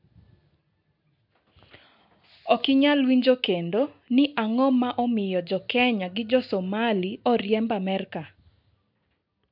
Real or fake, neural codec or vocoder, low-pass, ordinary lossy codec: real; none; 5.4 kHz; MP3, 48 kbps